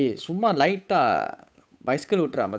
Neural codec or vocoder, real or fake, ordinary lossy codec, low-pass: codec, 16 kHz, 8 kbps, FunCodec, trained on Chinese and English, 25 frames a second; fake; none; none